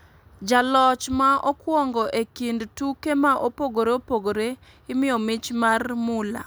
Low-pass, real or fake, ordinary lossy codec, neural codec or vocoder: none; real; none; none